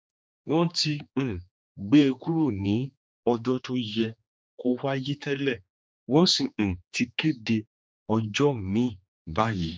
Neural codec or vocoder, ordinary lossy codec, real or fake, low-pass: codec, 16 kHz, 2 kbps, X-Codec, HuBERT features, trained on general audio; none; fake; none